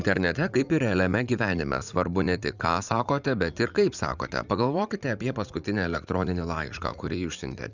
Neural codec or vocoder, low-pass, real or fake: vocoder, 44.1 kHz, 80 mel bands, Vocos; 7.2 kHz; fake